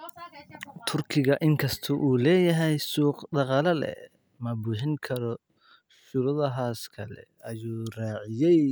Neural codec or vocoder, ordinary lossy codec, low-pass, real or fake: none; none; none; real